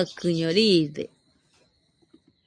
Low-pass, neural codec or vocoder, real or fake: 9.9 kHz; none; real